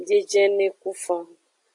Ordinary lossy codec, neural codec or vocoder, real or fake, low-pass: AAC, 64 kbps; none; real; 10.8 kHz